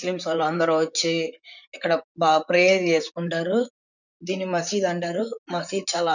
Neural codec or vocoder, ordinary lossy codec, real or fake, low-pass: vocoder, 44.1 kHz, 128 mel bands, Pupu-Vocoder; none; fake; 7.2 kHz